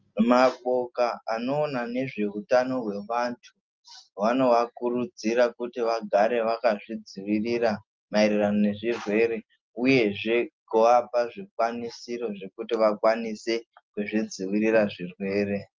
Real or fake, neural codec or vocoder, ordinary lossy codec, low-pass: real; none; Opus, 32 kbps; 7.2 kHz